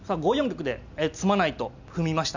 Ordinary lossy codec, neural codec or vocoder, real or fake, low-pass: none; none; real; 7.2 kHz